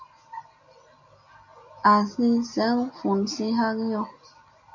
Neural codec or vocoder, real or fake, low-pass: none; real; 7.2 kHz